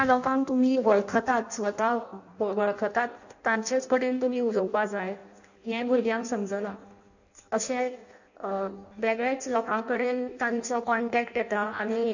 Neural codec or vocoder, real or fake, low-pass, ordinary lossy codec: codec, 16 kHz in and 24 kHz out, 0.6 kbps, FireRedTTS-2 codec; fake; 7.2 kHz; none